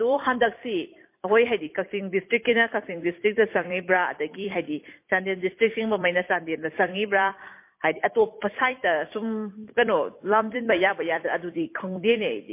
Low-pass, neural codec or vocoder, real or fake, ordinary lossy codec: 3.6 kHz; vocoder, 44.1 kHz, 128 mel bands every 256 samples, BigVGAN v2; fake; MP3, 24 kbps